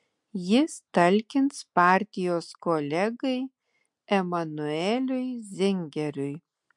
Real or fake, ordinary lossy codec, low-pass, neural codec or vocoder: real; MP3, 64 kbps; 10.8 kHz; none